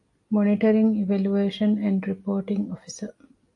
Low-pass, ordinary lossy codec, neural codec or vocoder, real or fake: 10.8 kHz; AAC, 48 kbps; none; real